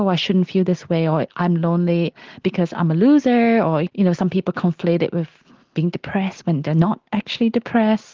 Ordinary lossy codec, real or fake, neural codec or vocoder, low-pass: Opus, 16 kbps; real; none; 7.2 kHz